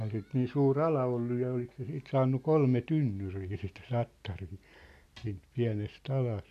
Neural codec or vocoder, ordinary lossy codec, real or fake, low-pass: none; none; real; 14.4 kHz